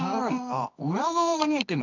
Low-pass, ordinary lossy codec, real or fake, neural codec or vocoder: 7.2 kHz; none; fake; codec, 24 kHz, 0.9 kbps, WavTokenizer, medium music audio release